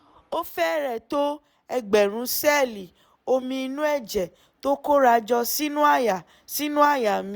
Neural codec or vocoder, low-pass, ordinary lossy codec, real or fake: none; none; none; real